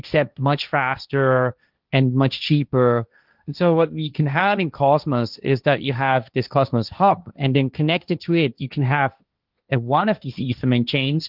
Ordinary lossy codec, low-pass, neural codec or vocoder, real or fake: Opus, 24 kbps; 5.4 kHz; codec, 16 kHz, 1.1 kbps, Voila-Tokenizer; fake